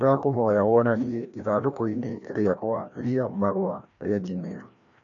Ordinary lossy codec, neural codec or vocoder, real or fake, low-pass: AAC, 64 kbps; codec, 16 kHz, 1 kbps, FreqCodec, larger model; fake; 7.2 kHz